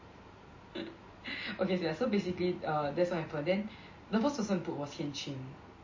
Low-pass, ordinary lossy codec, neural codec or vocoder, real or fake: 7.2 kHz; MP3, 32 kbps; none; real